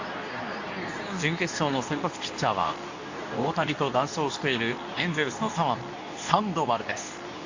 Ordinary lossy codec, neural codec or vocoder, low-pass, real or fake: AAC, 48 kbps; codec, 24 kHz, 0.9 kbps, WavTokenizer, medium speech release version 2; 7.2 kHz; fake